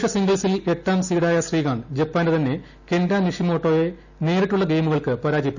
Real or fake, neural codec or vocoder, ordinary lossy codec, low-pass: real; none; none; 7.2 kHz